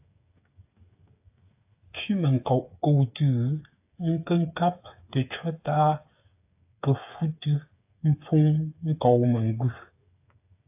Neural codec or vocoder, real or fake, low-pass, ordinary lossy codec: codec, 16 kHz, 8 kbps, FreqCodec, smaller model; fake; 3.6 kHz; AAC, 32 kbps